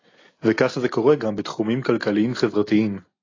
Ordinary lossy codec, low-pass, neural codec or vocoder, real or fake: AAC, 32 kbps; 7.2 kHz; none; real